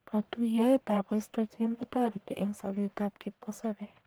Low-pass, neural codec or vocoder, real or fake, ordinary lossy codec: none; codec, 44.1 kHz, 1.7 kbps, Pupu-Codec; fake; none